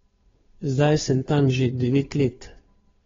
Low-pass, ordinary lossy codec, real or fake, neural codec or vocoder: 7.2 kHz; AAC, 24 kbps; fake; codec, 16 kHz, 2 kbps, FunCodec, trained on Chinese and English, 25 frames a second